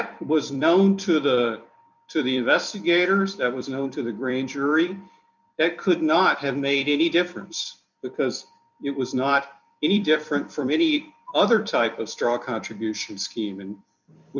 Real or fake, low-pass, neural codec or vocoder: fake; 7.2 kHz; vocoder, 44.1 kHz, 128 mel bands every 256 samples, BigVGAN v2